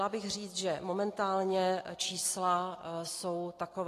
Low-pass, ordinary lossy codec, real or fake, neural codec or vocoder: 14.4 kHz; AAC, 48 kbps; real; none